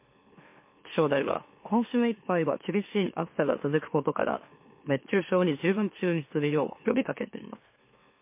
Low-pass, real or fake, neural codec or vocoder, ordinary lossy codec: 3.6 kHz; fake; autoencoder, 44.1 kHz, a latent of 192 numbers a frame, MeloTTS; MP3, 24 kbps